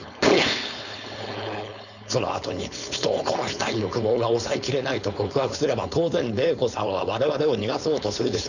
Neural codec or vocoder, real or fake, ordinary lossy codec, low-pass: codec, 16 kHz, 4.8 kbps, FACodec; fake; none; 7.2 kHz